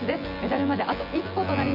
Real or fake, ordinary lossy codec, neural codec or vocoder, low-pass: fake; AAC, 48 kbps; vocoder, 24 kHz, 100 mel bands, Vocos; 5.4 kHz